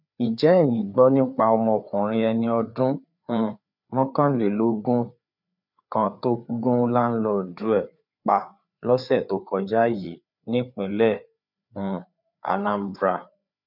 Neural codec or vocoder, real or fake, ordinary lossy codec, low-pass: codec, 16 kHz, 4 kbps, FreqCodec, larger model; fake; none; 5.4 kHz